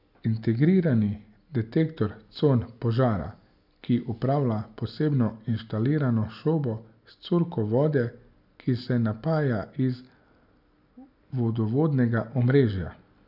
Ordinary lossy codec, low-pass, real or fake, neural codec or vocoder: none; 5.4 kHz; real; none